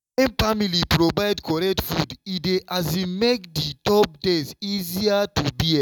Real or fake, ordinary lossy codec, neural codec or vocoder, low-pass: real; none; none; 19.8 kHz